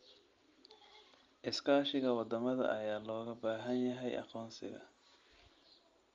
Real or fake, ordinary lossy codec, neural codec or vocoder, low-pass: real; Opus, 32 kbps; none; 7.2 kHz